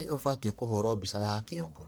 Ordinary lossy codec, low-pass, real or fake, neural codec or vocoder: none; none; fake; codec, 44.1 kHz, 1.7 kbps, Pupu-Codec